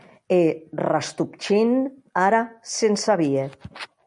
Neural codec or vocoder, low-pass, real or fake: none; 10.8 kHz; real